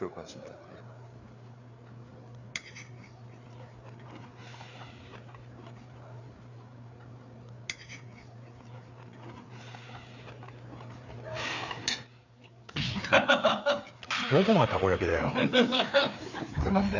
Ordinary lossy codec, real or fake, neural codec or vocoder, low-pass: none; fake; codec, 16 kHz, 4 kbps, FreqCodec, larger model; 7.2 kHz